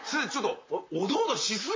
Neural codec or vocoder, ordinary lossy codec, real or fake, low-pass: vocoder, 44.1 kHz, 128 mel bands, Pupu-Vocoder; MP3, 32 kbps; fake; 7.2 kHz